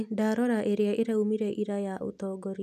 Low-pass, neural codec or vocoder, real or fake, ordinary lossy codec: 14.4 kHz; none; real; none